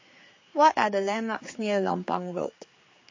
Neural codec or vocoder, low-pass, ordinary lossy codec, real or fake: codec, 16 kHz, 4 kbps, X-Codec, HuBERT features, trained on general audio; 7.2 kHz; MP3, 32 kbps; fake